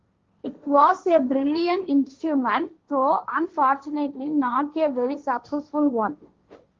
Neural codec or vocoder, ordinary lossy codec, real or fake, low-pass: codec, 16 kHz, 1.1 kbps, Voila-Tokenizer; Opus, 32 kbps; fake; 7.2 kHz